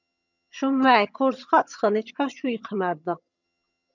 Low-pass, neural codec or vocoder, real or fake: 7.2 kHz; vocoder, 22.05 kHz, 80 mel bands, HiFi-GAN; fake